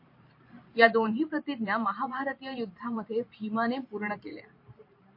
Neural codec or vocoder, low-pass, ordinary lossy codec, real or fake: vocoder, 44.1 kHz, 128 mel bands every 512 samples, BigVGAN v2; 5.4 kHz; MP3, 32 kbps; fake